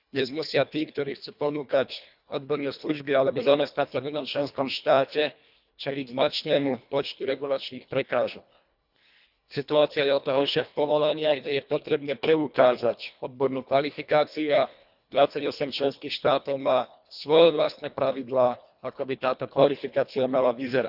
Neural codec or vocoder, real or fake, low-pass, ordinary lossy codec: codec, 24 kHz, 1.5 kbps, HILCodec; fake; 5.4 kHz; none